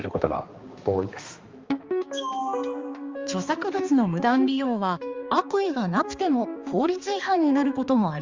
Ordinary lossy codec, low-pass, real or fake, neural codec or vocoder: Opus, 32 kbps; 7.2 kHz; fake; codec, 16 kHz, 2 kbps, X-Codec, HuBERT features, trained on balanced general audio